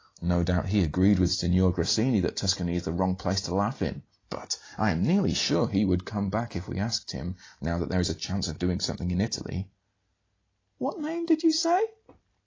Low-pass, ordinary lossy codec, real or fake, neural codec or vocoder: 7.2 kHz; AAC, 32 kbps; real; none